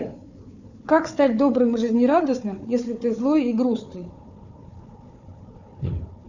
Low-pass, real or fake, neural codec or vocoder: 7.2 kHz; fake; codec, 16 kHz, 4 kbps, FunCodec, trained on Chinese and English, 50 frames a second